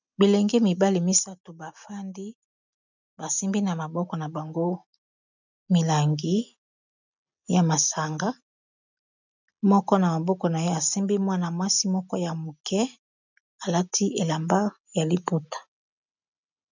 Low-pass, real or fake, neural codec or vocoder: 7.2 kHz; real; none